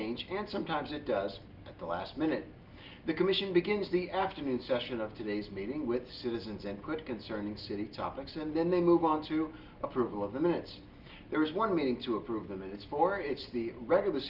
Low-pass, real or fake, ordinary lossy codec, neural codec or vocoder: 5.4 kHz; real; Opus, 32 kbps; none